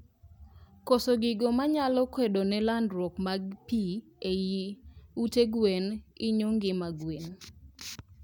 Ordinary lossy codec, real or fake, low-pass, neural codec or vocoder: none; real; none; none